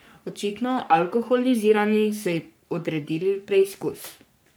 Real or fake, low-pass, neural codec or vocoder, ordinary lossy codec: fake; none; codec, 44.1 kHz, 3.4 kbps, Pupu-Codec; none